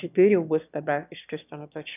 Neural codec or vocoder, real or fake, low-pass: autoencoder, 22.05 kHz, a latent of 192 numbers a frame, VITS, trained on one speaker; fake; 3.6 kHz